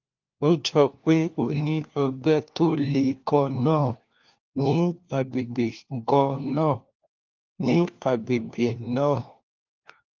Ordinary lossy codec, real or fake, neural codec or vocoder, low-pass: Opus, 32 kbps; fake; codec, 16 kHz, 1 kbps, FunCodec, trained on LibriTTS, 50 frames a second; 7.2 kHz